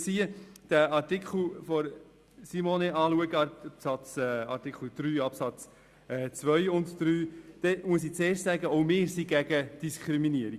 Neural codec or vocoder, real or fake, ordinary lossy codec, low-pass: none; real; Opus, 64 kbps; 14.4 kHz